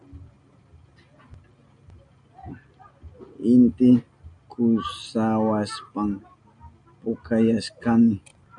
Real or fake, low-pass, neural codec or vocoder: real; 9.9 kHz; none